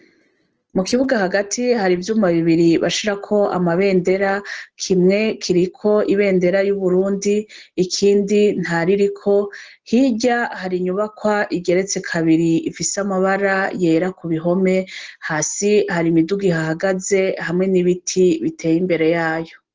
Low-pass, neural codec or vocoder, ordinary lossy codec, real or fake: 7.2 kHz; none; Opus, 16 kbps; real